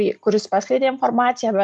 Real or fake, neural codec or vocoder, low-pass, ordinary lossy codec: real; none; 10.8 kHz; MP3, 96 kbps